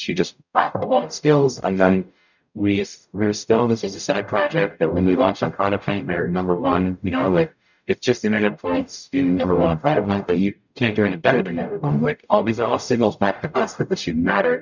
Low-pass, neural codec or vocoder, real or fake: 7.2 kHz; codec, 44.1 kHz, 0.9 kbps, DAC; fake